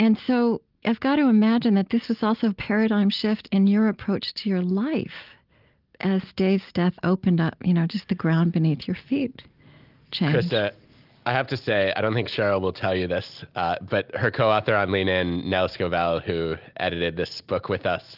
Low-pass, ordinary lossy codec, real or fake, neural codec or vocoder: 5.4 kHz; Opus, 32 kbps; real; none